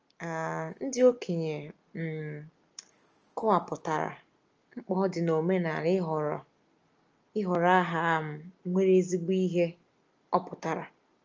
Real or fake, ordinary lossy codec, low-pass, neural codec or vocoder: real; Opus, 24 kbps; 7.2 kHz; none